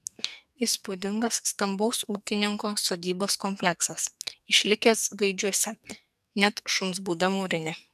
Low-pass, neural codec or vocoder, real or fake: 14.4 kHz; codec, 44.1 kHz, 2.6 kbps, SNAC; fake